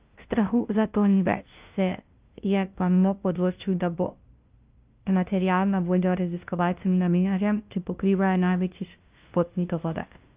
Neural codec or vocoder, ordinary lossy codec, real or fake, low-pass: codec, 16 kHz, 0.5 kbps, FunCodec, trained on LibriTTS, 25 frames a second; Opus, 24 kbps; fake; 3.6 kHz